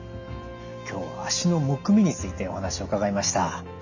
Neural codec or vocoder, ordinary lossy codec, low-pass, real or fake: none; none; 7.2 kHz; real